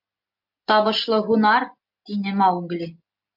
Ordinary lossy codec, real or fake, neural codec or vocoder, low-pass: MP3, 48 kbps; real; none; 5.4 kHz